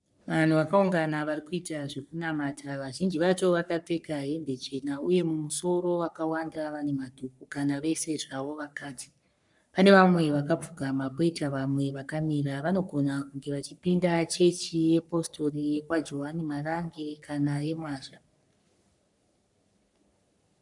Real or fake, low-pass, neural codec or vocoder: fake; 10.8 kHz; codec, 44.1 kHz, 3.4 kbps, Pupu-Codec